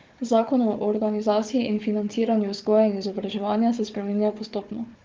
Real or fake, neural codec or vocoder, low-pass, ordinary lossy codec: fake; codec, 16 kHz, 4 kbps, FunCodec, trained on Chinese and English, 50 frames a second; 7.2 kHz; Opus, 32 kbps